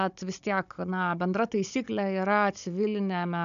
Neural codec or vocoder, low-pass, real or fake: codec, 16 kHz, 16 kbps, FunCodec, trained on Chinese and English, 50 frames a second; 7.2 kHz; fake